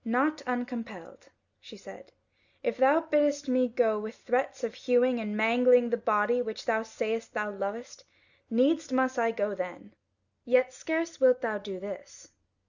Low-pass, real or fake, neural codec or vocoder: 7.2 kHz; real; none